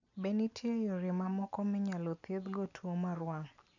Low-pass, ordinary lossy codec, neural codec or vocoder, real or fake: 7.2 kHz; none; none; real